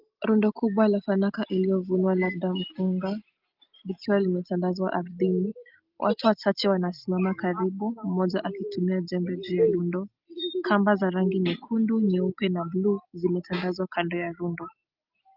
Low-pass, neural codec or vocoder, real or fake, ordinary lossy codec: 5.4 kHz; none; real; Opus, 32 kbps